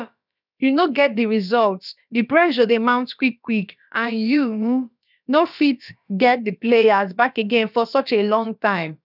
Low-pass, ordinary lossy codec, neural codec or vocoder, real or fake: 5.4 kHz; none; codec, 16 kHz, about 1 kbps, DyCAST, with the encoder's durations; fake